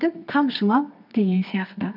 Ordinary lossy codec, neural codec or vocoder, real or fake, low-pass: none; codec, 24 kHz, 0.9 kbps, WavTokenizer, medium music audio release; fake; 5.4 kHz